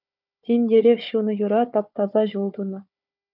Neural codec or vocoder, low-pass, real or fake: codec, 16 kHz, 4 kbps, FunCodec, trained on Chinese and English, 50 frames a second; 5.4 kHz; fake